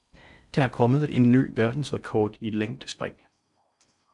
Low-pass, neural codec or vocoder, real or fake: 10.8 kHz; codec, 16 kHz in and 24 kHz out, 0.6 kbps, FocalCodec, streaming, 4096 codes; fake